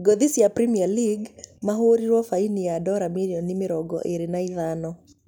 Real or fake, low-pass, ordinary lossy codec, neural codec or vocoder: real; 19.8 kHz; none; none